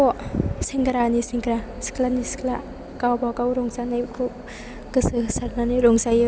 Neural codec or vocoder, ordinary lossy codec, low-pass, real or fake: none; none; none; real